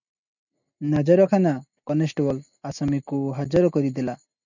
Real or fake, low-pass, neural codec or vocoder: real; 7.2 kHz; none